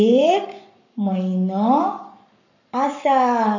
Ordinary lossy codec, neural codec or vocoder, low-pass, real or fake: none; codec, 44.1 kHz, 7.8 kbps, Pupu-Codec; 7.2 kHz; fake